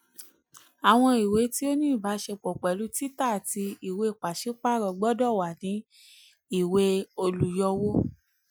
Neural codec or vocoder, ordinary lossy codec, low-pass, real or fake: none; none; none; real